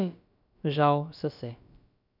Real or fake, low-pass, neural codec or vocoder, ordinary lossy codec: fake; 5.4 kHz; codec, 16 kHz, about 1 kbps, DyCAST, with the encoder's durations; none